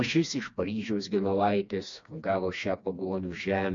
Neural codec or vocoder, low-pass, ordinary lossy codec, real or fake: codec, 16 kHz, 2 kbps, FreqCodec, smaller model; 7.2 kHz; MP3, 48 kbps; fake